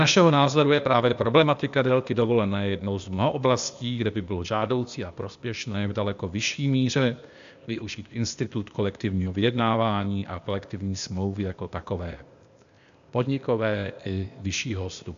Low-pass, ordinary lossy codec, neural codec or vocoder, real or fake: 7.2 kHz; AAC, 96 kbps; codec, 16 kHz, 0.8 kbps, ZipCodec; fake